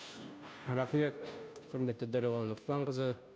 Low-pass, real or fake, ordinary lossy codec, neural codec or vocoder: none; fake; none; codec, 16 kHz, 0.5 kbps, FunCodec, trained on Chinese and English, 25 frames a second